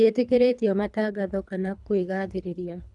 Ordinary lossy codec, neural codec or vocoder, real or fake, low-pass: none; codec, 24 kHz, 3 kbps, HILCodec; fake; 10.8 kHz